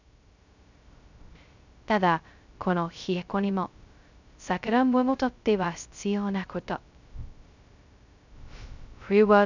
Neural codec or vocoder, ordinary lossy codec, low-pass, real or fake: codec, 16 kHz, 0.2 kbps, FocalCodec; none; 7.2 kHz; fake